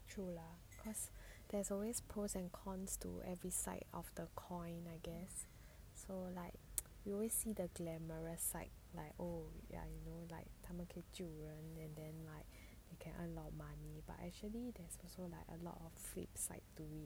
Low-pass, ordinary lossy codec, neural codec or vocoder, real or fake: none; none; none; real